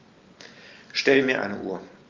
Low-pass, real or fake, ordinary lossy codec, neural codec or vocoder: 7.2 kHz; real; Opus, 32 kbps; none